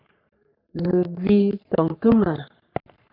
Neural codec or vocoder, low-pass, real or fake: codec, 44.1 kHz, 3.4 kbps, Pupu-Codec; 5.4 kHz; fake